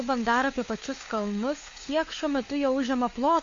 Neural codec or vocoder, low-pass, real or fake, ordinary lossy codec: codec, 16 kHz, 4 kbps, FunCodec, trained on LibriTTS, 50 frames a second; 7.2 kHz; fake; AAC, 48 kbps